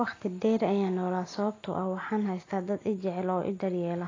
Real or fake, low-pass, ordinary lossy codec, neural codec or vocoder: real; 7.2 kHz; AAC, 32 kbps; none